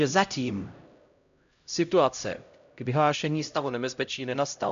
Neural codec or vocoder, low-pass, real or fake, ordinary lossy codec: codec, 16 kHz, 0.5 kbps, X-Codec, HuBERT features, trained on LibriSpeech; 7.2 kHz; fake; MP3, 64 kbps